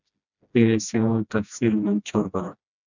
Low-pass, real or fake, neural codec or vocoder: 7.2 kHz; fake; codec, 16 kHz, 1 kbps, FreqCodec, smaller model